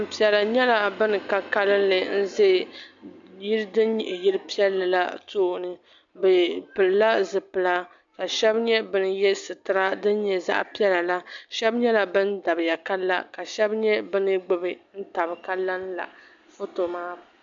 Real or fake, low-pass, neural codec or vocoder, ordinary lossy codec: real; 7.2 kHz; none; MP3, 64 kbps